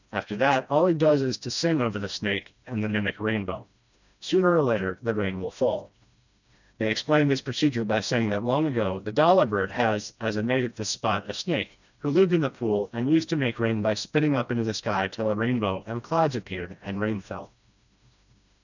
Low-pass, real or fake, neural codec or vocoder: 7.2 kHz; fake; codec, 16 kHz, 1 kbps, FreqCodec, smaller model